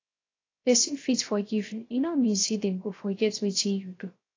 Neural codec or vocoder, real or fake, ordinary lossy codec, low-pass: codec, 16 kHz, 0.3 kbps, FocalCodec; fake; AAC, 32 kbps; 7.2 kHz